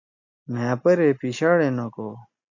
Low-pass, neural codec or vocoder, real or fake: 7.2 kHz; none; real